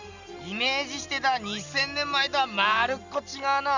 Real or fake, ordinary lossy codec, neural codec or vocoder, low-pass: real; none; none; 7.2 kHz